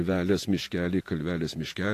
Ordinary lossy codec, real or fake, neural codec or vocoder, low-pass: AAC, 64 kbps; fake; vocoder, 48 kHz, 128 mel bands, Vocos; 14.4 kHz